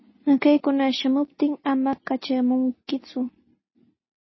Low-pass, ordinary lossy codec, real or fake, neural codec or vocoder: 7.2 kHz; MP3, 24 kbps; real; none